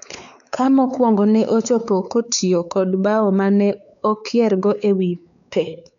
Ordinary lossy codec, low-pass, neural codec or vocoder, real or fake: none; 7.2 kHz; codec, 16 kHz, 4 kbps, X-Codec, WavLM features, trained on Multilingual LibriSpeech; fake